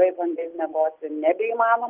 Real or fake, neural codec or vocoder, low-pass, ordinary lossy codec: real; none; 3.6 kHz; Opus, 24 kbps